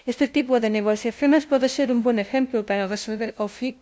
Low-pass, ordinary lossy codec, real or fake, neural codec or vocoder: none; none; fake; codec, 16 kHz, 0.5 kbps, FunCodec, trained on LibriTTS, 25 frames a second